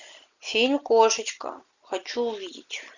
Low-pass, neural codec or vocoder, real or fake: 7.2 kHz; none; real